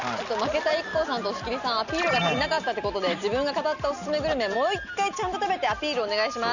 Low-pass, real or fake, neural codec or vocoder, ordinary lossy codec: 7.2 kHz; real; none; none